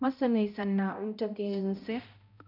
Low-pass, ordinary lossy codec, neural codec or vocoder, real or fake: 5.4 kHz; none; codec, 16 kHz, 0.5 kbps, X-Codec, HuBERT features, trained on balanced general audio; fake